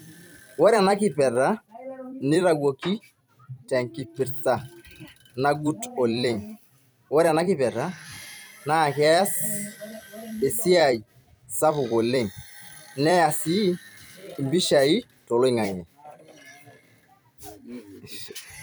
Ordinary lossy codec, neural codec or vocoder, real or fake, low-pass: none; vocoder, 44.1 kHz, 128 mel bands every 256 samples, BigVGAN v2; fake; none